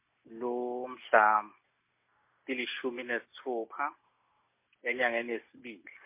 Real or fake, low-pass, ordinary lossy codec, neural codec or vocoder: real; 3.6 kHz; MP3, 24 kbps; none